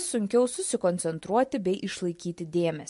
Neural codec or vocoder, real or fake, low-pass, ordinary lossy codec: none; real; 14.4 kHz; MP3, 48 kbps